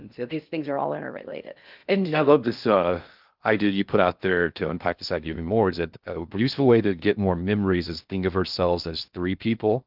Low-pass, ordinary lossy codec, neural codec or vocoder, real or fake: 5.4 kHz; Opus, 24 kbps; codec, 16 kHz in and 24 kHz out, 0.6 kbps, FocalCodec, streaming, 2048 codes; fake